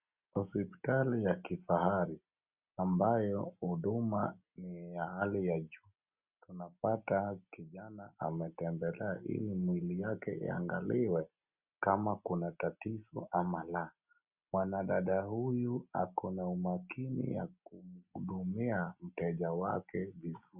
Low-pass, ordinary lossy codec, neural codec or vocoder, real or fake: 3.6 kHz; Opus, 64 kbps; none; real